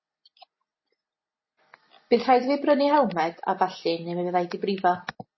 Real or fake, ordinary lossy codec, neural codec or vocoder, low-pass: real; MP3, 24 kbps; none; 7.2 kHz